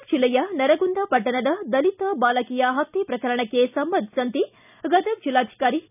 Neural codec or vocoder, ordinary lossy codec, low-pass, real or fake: none; none; 3.6 kHz; real